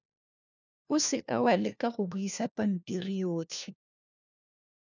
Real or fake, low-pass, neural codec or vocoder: fake; 7.2 kHz; codec, 16 kHz, 1 kbps, FunCodec, trained on LibriTTS, 50 frames a second